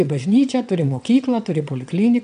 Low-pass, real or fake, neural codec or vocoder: 9.9 kHz; fake; vocoder, 22.05 kHz, 80 mel bands, WaveNeXt